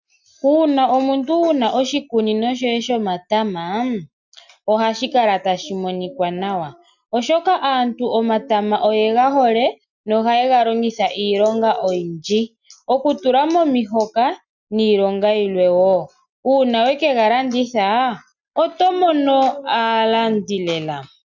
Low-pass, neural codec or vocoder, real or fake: 7.2 kHz; none; real